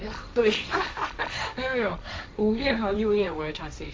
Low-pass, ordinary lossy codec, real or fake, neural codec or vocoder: none; none; fake; codec, 16 kHz, 1.1 kbps, Voila-Tokenizer